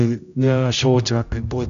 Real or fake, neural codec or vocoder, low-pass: fake; codec, 16 kHz, 0.5 kbps, X-Codec, HuBERT features, trained on general audio; 7.2 kHz